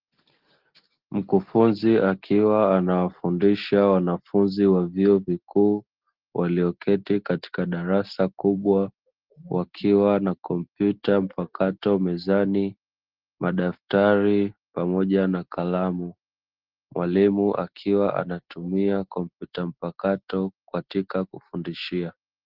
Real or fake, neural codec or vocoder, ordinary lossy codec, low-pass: real; none; Opus, 16 kbps; 5.4 kHz